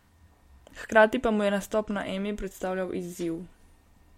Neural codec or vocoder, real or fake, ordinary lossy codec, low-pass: none; real; MP3, 64 kbps; 19.8 kHz